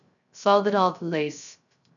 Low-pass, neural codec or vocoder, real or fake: 7.2 kHz; codec, 16 kHz, 0.3 kbps, FocalCodec; fake